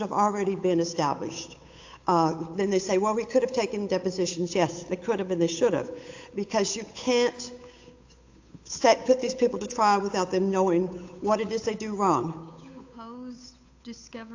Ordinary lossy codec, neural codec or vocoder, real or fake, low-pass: AAC, 48 kbps; codec, 16 kHz, 8 kbps, FunCodec, trained on Chinese and English, 25 frames a second; fake; 7.2 kHz